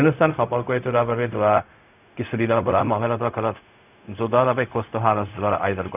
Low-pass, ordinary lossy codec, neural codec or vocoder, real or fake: 3.6 kHz; none; codec, 16 kHz, 0.4 kbps, LongCat-Audio-Codec; fake